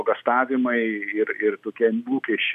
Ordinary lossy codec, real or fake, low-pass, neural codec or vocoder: AAC, 96 kbps; real; 14.4 kHz; none